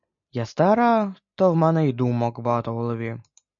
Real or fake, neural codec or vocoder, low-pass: real; none; 7.2 kHz